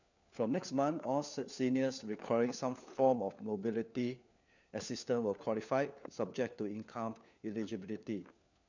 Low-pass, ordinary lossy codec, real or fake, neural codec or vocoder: 7.2 kHz; none; fake; codec, 16 kHz, 4 kbps, FunCodec, trained on LibriTTS, 50 frames a second